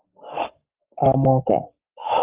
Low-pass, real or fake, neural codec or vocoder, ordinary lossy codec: 3.6 kHz; fake; codec, 44.1 kHz, 7.8 kbps, Pupu-Codec; Opus, 32 kbps